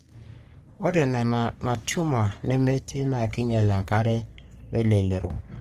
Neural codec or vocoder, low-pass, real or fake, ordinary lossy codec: codec, 44.1 kHz, 3.4 kbps, Pupu-Codec; 14.4 kHz; fake; Opus, 24 kbps